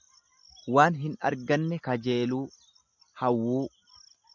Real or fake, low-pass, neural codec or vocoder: real; 7.2 kHz; none